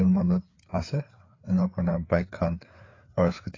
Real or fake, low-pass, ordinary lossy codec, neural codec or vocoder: fake; 7.2 kHz; AAC, 32 kbps; codec, 16 kHz, 4 kbps, FreqCodec, larger model